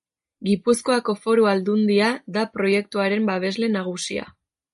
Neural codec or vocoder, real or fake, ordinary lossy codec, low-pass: none; real; MP3, 48 kbps; 14.4 kHz